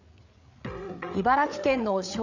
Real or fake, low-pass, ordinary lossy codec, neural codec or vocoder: fake; 7.2 kHz; none; codec, 16 kHz, 8 kbps, FreqCodec, larger model